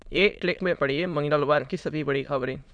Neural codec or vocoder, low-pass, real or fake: autoencoder, 22.05 kHz, a latent of 192 numbers a frame, VITS, trained on many speakers; 9.9 kHz; fake